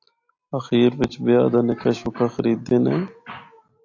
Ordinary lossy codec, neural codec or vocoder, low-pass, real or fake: AAC, 48 kbps; none; 7.2 kHz; real